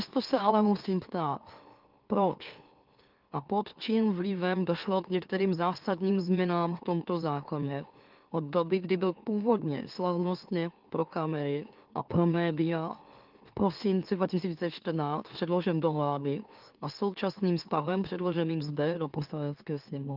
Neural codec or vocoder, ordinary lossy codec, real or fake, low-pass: autoencoder, 44.1 kHz, a latent of 192 numbers a frame, MeloTTS; Opus, 32 kbps; fake; 5.4 kHz